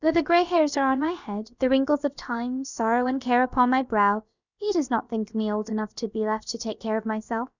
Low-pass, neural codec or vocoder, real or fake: 7.2 kHz; codec, 16 kHz, about 1 kbps, DyCAST, with the encoder's durations; fake